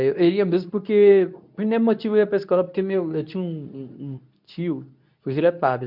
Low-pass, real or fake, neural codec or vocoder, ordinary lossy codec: 5.4 kHz; fake; codec, 24 kHz, 0.9 kbps, WavTokenizer, medium speech release version 2; none